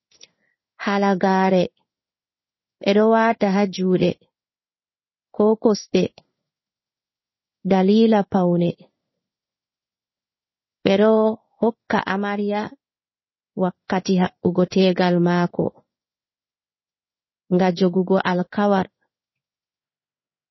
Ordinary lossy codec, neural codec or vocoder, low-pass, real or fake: MP3, 24 kbps; codec, 16 kHz in and 24 kHz out, 1 kbps, XY-Tokenizer; 7.2 kHz; fake